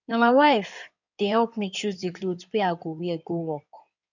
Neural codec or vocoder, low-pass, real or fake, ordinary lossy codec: codec, 16 kHz in and 24 kHz out, 2.2 kbps, FireRedTTS-2 codec; 7.2 kHz; fake; none